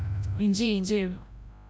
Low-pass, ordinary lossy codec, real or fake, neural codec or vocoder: none; none; fake; codec, 16 kHz, 0.5 kbps, FreqCodec, larger model